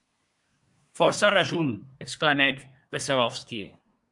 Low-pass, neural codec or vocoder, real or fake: 10.8 kHz; codec, 24 kHz, 1 kbps, SNAC; fake